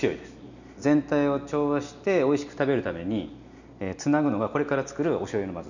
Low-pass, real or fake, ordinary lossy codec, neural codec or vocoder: 7.2 kHz; real; none; none